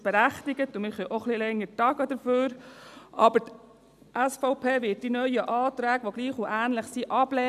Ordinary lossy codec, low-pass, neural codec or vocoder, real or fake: none; 14.4 kHz; none; real